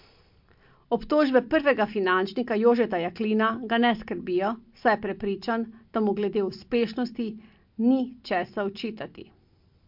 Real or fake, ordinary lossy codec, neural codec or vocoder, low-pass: real; MP3, 48 kbps; none; 5.4 kHz